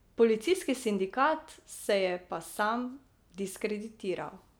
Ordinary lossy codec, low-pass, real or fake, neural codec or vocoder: none; none; real; none